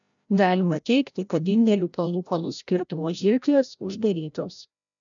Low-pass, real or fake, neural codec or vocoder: 7.2 kHz; fake; codec, 16 kHz, 0.5 kbps, FreqCodec, larger model